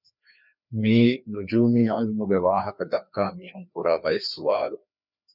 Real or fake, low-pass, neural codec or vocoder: fake; 5.4 kHz; codec, 16 kHz, 2 kbps, FreqCodec, larger model